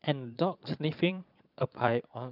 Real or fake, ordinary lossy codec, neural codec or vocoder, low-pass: fake; none; vocoder, 22.05 kHz, 80 mel bands, Vocos; 5.4 kHz